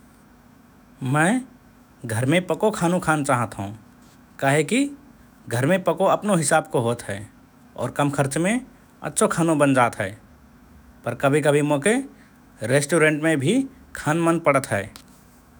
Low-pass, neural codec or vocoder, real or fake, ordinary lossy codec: none; autoencoder, 48 kHz, 128 numbers a frame, DAC-VAE, trained on Japanese speech; fake; none